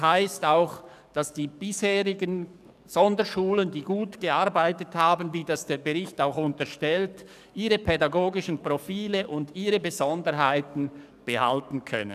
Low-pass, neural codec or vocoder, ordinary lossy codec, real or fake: 14.4 kHz; codec, 44.1 kHz, 7.8 kbps, DAC; none; fake